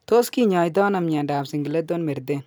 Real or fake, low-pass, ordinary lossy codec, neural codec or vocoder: real; none; none; none